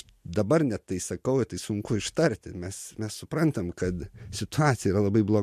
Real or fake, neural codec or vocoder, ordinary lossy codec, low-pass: real; none; MP3, 64 kbps; 14.4 kHz